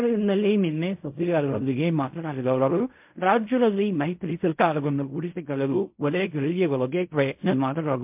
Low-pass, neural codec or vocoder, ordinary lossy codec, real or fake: 3.6 kHz; codec, 16 kHz in and 24 kHz out, 0.4 kbps, LongCat-Audio-Codec, fine tuned four codebook decoder; MP3, 32 kbps; fake